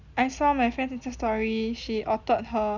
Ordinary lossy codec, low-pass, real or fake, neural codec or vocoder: none; 7.2 kHz; real; none